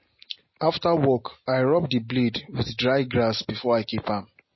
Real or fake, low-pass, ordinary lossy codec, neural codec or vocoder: real; 7.2 kHz; MP3, 24 kbps; none